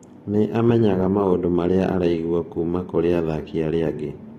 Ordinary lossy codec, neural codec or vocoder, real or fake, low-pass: AAC, 32 kbps; vocoder, 44.1 kHz, 128 mel bands every 512 samples, BigVGAN v2; fake; 19.8 kHz